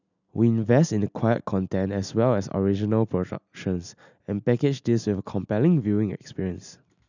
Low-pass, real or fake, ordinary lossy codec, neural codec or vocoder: 7.2 kHz; real; none; none